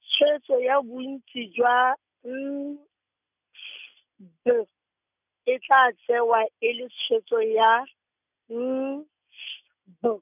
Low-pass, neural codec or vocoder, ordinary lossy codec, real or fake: 3.6 kHz; none; none; real